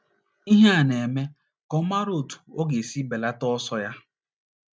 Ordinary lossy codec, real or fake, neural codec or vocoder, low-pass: none; real; none; none